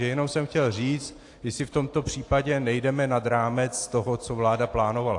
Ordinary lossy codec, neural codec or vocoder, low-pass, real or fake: AAC, 48 kbps; none; 10.8 kHz; real